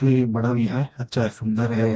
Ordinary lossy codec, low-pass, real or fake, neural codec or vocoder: none; none; fake; codec, 16 kHz, 1 kbps, FreqCodec, smaller model